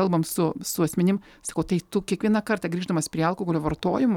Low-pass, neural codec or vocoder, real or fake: 19.8 kHz; none; real